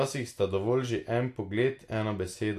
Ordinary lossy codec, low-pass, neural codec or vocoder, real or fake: AAC, 64 kbps; 14.4 kHz; none; real